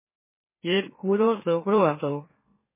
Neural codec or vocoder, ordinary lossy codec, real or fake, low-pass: autoencoder, 44.1 kHz, a latent of 192 numbers a frame, MeloTTS; MP3, 16 kbps; fake; 3.6 kHz